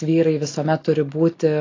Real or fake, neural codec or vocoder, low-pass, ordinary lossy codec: real; none; 7.2 kHz; AAC, 32 kbps